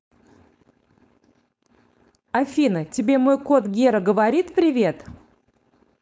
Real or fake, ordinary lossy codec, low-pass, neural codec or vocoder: fake; none; none; codec, 16 kHz, 4.8 kbps, FACodec